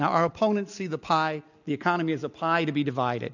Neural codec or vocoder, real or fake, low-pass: none; real; 7.2 kHz